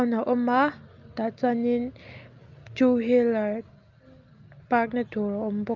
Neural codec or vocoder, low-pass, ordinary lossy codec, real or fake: none; 7.2 kHz; Opus, 32 kbps; real